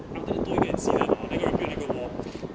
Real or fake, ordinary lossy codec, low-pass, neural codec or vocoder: real; none; none; none